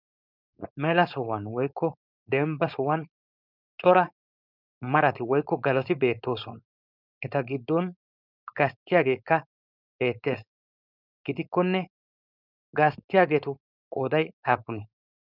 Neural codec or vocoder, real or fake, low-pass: codec, 16 kHz, 4.8 kbps, FACodec; fake; 5.4 kHz